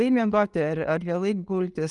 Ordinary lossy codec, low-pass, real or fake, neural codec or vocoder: Opus, 32 kbps; 10.8 kHz; real; none